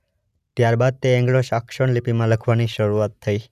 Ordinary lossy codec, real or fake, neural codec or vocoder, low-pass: none; real; none; 14.4 kHz